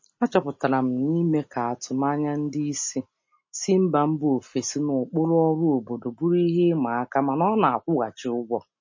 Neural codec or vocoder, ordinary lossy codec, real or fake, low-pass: none; MP3, 32 kbps; real; 7.2 kHz